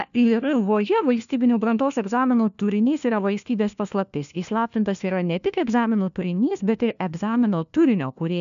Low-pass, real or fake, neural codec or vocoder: 7.2 kHz; fake; codec, 16 kHz, 1 kbps, FunCodec, trained on LibriTTS, 50 frames a second